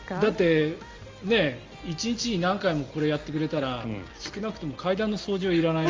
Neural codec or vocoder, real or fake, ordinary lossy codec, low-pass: none; real; Opus, 32 kbps; 7.2 kHz